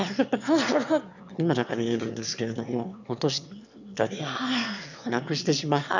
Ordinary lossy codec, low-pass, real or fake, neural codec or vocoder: none; 7.2 kHz; fake; autoencoder, 22.05 kHz, a latent of 192 numbers a frame, VITS, trained on one speaker